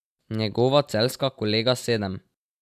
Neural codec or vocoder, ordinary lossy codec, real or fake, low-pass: none; AAC, 96 kbps; real; 14.4 kHz